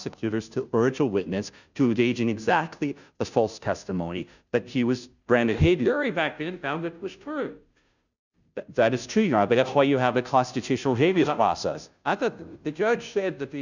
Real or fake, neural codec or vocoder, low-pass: fake; codec, 16 kHz, 0.5 kbps, FunCodec, trained on Chinese and English, 25 frames a second; 7.2 kHz